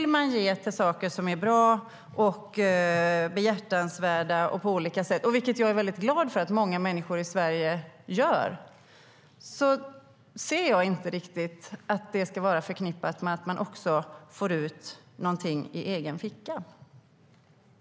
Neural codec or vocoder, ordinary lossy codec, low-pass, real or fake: none; none; none; real